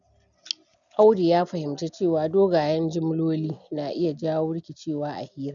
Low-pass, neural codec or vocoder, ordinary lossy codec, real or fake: 7.2 kHz; none; none; real